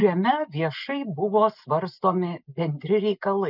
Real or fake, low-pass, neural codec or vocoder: real; 5.4 kHz; none